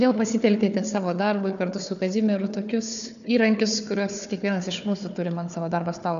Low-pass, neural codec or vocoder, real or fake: 7.2 kHz; codec, 16 kHz, 4 kbps, FunCodec, trained on Chinese and English, 50 frames a second; fake